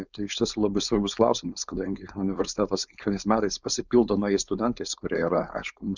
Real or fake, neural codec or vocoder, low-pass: fake; codec, 16 kHz, 4.8 kbps, FACodec; 7.2 kHz